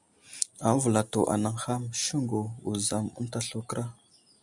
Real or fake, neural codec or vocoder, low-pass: real; none; 10.8 kHz